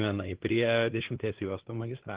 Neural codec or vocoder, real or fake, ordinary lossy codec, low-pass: codec, 16 kHz, 2 kbps, FunCodec, trained on LibriTTS, 25 frames a second; fake; Opus, 16 kbps; 3.6 kHz